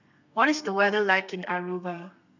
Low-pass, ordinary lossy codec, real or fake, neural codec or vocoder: 7.2 kHz; none; fake; codec, 32 kHz, 1.9 kbps, SNAC